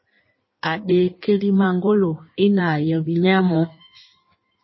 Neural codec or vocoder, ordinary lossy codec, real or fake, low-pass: codec, 16 kHz in and 24 kHz out, 1.1 kbps, FireRedTTS-2 codec; MP3, 24 kbps; fake; 7.2 kHz